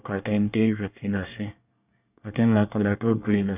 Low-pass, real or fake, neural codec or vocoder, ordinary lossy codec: 3.6 kHz; fake; codec, 24 kHz, 1 kbps, SNAC; none